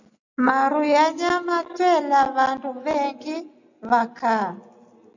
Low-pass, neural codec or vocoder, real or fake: 7.2 kHz; none; real